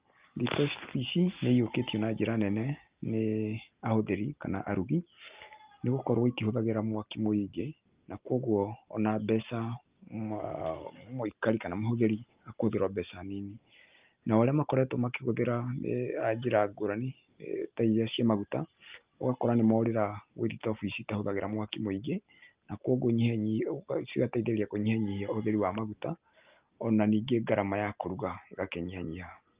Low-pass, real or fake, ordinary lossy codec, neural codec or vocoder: 3.6 kHz; real; Opus, 32 kbps; none